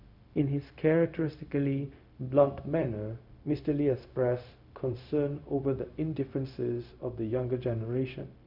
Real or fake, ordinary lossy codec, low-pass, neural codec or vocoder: fake; none; 5.4 kHz; codec, 16 kHz, 0.4 kbps, LongCat-Audio-Codec